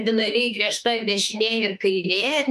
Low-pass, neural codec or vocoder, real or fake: 14.4 kHz; autoencoder, 48 kHz, 32 numbers a frame, DAC-VAE, trained on Japanese speech; fake